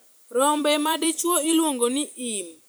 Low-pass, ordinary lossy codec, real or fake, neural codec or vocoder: none; none; fake; vocoder, 44.1 kHz, 128 mel bands every 256 samples, BigVGAN v2